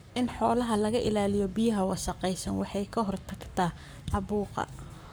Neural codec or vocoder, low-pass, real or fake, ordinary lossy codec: codec, 44.1 kHz, 7.8 kbps, Pupu-Codec; none; fake; none